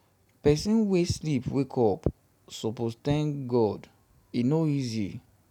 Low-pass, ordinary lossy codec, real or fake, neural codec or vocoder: 19.8 kHz; none; real; none